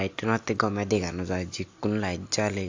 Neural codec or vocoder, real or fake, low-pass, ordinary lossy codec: none; real; 7.2 kHz; AAC, 48 kbps